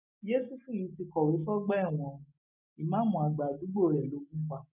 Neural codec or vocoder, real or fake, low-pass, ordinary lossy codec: none; real; 3.6 kHz; none